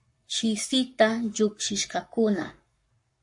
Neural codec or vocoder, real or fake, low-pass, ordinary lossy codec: codec, 44.1 kHz, 7.8 kbps, Pupu-Codec; fake; 10.8 kHz; MP3, 48 kbps